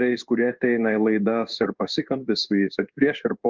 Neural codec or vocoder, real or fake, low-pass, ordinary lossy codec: codec, 16 kHz in and 24 kHz out, 1 kbps, XY-Tokenizer; fake; 7.2 kHz; Opus, 24 kbps